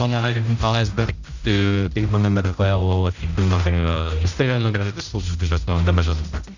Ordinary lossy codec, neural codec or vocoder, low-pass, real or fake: none; codec, 16 kHz, 0.5 kbps, X-Codec, HuBERT features, trained on general audio; 7.2 kHz; fake